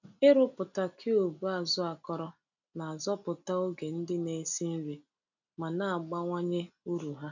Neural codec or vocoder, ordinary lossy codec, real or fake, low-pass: none; none; real; 7.2 kHz